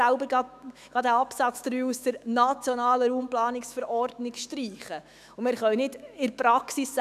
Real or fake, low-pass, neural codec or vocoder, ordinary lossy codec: fake; 14.4 kHz; autoencoder, 48 kHz, 128 numbers a frame, DAC-VAE, trained on Japanese speech; none